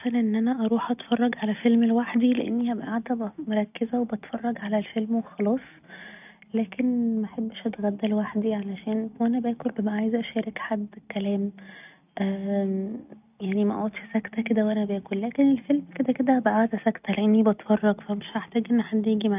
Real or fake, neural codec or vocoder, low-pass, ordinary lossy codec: real; none; 3.6 kHz; none